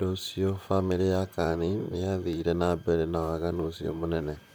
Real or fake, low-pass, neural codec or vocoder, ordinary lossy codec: fake; none; vocoder, 44.1 kHz, 128 mel bands, Pupu-Vocoder; none